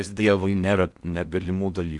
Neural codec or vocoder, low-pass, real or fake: codec, 16 kHz in and 24 kHz out, 0.6 kbps, FocalCodec, streaming, 4096 codes; 10.8 kHz; fake